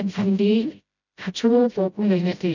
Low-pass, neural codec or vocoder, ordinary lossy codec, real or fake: 7.2 kHz; codec, 16 kHz, 0.5 kbps, FreqCodec, smaller model; none; fake